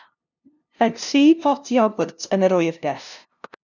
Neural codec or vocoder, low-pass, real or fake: codec, 16 kHz, 0.5 kbps, FunCodec, trained on LibriTTS, 25 frames a second; 7.2 kHz; fake